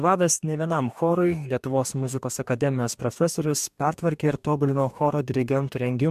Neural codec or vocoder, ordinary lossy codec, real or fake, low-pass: codec, 44.1 kHz, 2.6 kbps, DAC; MP3, 96 kbps; fake; 14.4 kHz